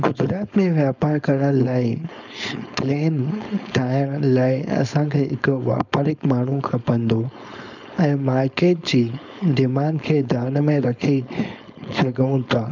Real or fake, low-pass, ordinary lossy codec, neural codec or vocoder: fake; 7.2 kHz; none; codec, 16 kHz, 4.8 kbps, FACodec